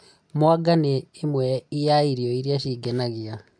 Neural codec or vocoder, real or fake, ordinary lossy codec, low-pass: none; real; none; 9.9 kHz